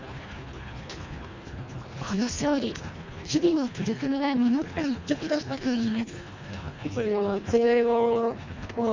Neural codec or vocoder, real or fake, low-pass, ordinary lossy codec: codec, 24 kHz, 1.5 kbps, HILCodec; fake; 7.2 kHz; MP3, 64 kbps